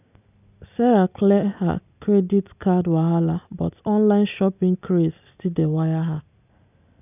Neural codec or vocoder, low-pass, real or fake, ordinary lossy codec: none; 3.6 kHz; real; none